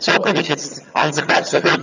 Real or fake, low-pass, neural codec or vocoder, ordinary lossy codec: fake; 7.2 kHz; vocoder, 22.05 kHz, 80 mel bands, HiFi-GAN; none